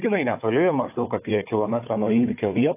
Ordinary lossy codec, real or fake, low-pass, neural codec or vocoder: AAC, 24 kbps; fake; 3.6 kHz; codec, 16 kHz, 4 kbps, FunCodec, trained on Chinese and English, 50 frames a second